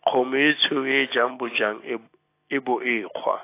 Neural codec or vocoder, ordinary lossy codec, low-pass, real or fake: none; AAC, 24 kbps; 3.6 kHz; real